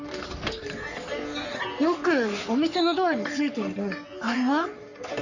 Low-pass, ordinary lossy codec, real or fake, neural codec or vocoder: 7.2 kHz; none; fake; codec, 44.1 kHz, 3.4 kbps, Pupu-Codec